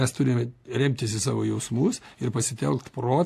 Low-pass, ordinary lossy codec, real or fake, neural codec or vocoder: 14.4 kHz; AAC, 64 kbps; real; none